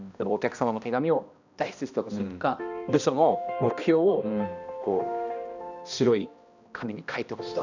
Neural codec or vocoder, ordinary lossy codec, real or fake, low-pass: codec, 16 kHz, 1 kbps, X-Codec, HuBERT features, trained on balanced general audio; none; fake; 7.2 kHz